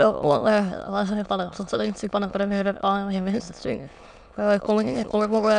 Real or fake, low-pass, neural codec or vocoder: fake; 9.9 kHz; autoencoder, 22.05 kHz, a latent of 192 numbers a frame, VITS, trained on many speakers